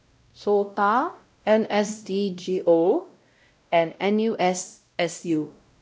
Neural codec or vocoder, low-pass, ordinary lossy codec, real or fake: codec, 16 kHz, 0.5 kbps, X-Codec, WavLM features, trained on Multilingual LibriSpeech; none; none; fake